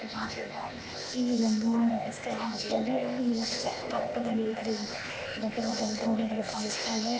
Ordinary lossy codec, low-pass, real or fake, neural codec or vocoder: none; none; fake; codec, 16 kHz, 0.8 kbps, ZipCodec